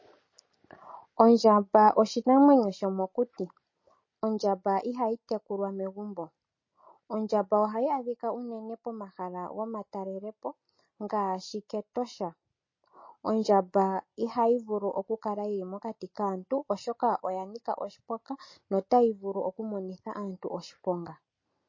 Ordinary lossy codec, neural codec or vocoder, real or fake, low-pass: MP3, 32 kbps; none; real; 7.2 kHz